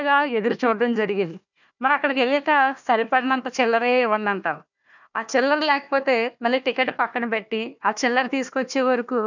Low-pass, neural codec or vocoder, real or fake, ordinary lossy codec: 7.2 kHz; codec, 16 kHz, 1 kbps, FunCodec, trained on Chinese and English, 50 frames a second; fake; none